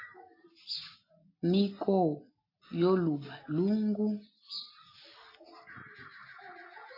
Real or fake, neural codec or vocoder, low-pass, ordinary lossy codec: real; none; 5.4 kHz; AAC, 24 kbps